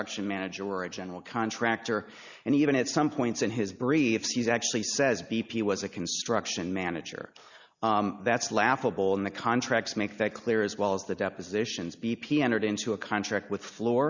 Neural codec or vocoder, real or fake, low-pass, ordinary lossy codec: none; real; 7.2 kHz; Opus, 64 kbps